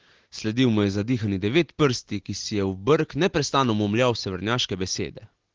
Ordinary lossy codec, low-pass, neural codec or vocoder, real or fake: Opus, 16 kbps; 7.2 kHz; none; real